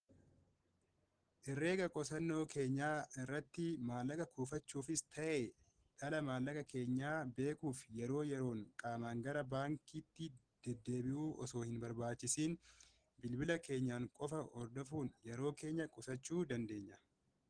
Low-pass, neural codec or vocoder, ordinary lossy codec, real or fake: 9.9 kHz; none; Opus, 16 kbps; real